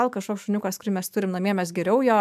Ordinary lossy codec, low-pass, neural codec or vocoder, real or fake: AAC, 96 kbps; 14.4 kHz; autoencoder, 48 kHz, 128 numbers a frame, DAC-VAE, trained on Japanese speech; fake